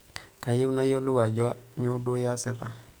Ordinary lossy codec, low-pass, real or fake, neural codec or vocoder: none; none; fake; codec, 44.1 kHz, 2.6 kbps, SNAC